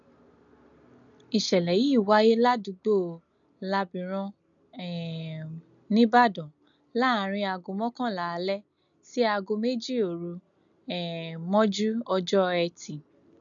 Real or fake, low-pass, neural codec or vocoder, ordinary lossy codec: real; 7.2 kHz; none; none